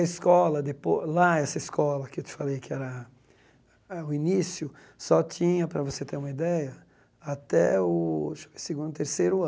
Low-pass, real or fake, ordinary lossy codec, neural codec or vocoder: none; real; none; none